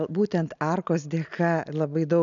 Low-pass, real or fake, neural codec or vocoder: 7.2 kHz; real; none